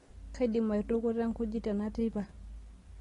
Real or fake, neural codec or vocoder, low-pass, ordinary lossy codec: real; none; 10.8 kHz; AAC, 32 kbps